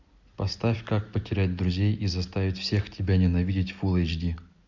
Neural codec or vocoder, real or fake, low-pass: none; real; 7.2 kHz